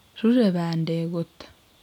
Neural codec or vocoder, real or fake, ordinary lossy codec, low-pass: none; real; none; 19.8 kHz